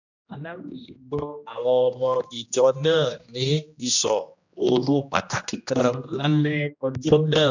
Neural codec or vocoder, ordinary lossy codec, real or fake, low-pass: codec, 16 kHz, 1 kbps, X-Codec, HuBERT features, trained on general audio; none; fake; 7.2 kHz